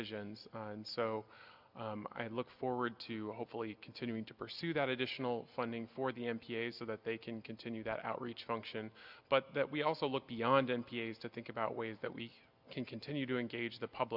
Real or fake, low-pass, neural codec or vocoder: real; 5.4 kHz; none